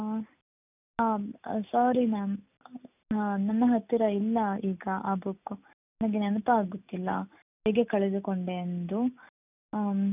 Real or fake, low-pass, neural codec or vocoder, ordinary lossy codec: real; 3.6 kHz; none; none